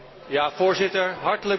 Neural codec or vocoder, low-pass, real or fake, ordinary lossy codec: none; 7.2 kHz; real; MP3, 24 kbps